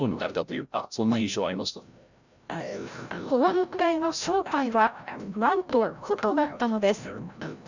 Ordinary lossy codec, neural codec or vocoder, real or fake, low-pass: none; codec, 16 kHz, 0.5 kbps, FreqCodec, larger model; fake; 7.2 kHz